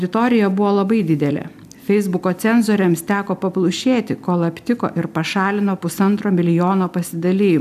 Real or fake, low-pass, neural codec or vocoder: real; 14.4 kHz; none